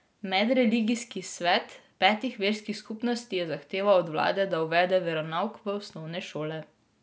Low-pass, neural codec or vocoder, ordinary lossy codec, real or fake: none; none; none; real